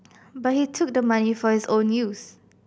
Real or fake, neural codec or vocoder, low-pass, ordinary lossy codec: real; none; none; none